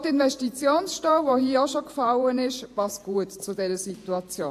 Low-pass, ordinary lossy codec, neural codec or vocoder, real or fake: 14.4 kHz; AAC, 64 kbps; vocoder, 48 kHz, 128 mel bands, Vocos; fake